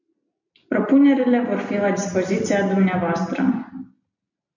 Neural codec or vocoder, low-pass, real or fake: none; 7.2 kHz; real